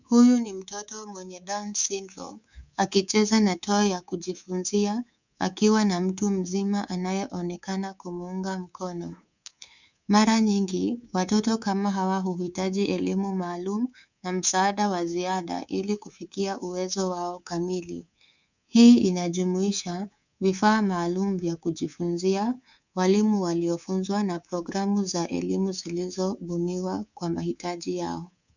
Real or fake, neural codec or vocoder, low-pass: fake; codec, 24 kHz, 3.1 kbps, DualCodec; 7.2 kHz